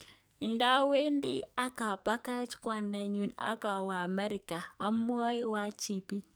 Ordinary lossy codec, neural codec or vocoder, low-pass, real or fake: none; codec, 44.1 kHz, 2.6 kbps, SNAC; none; fake